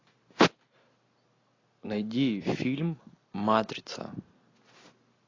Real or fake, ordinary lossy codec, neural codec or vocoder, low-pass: real; AAC, 32 kbps; none; 7.2 kHz